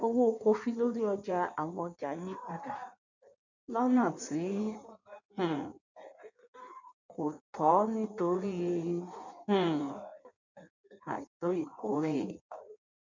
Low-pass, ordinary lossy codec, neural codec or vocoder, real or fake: 7.2 kHz; none; codec, 16 kHz in and 24 kHz out, 1.1 kbps, FireRedTTS-2 codec; fake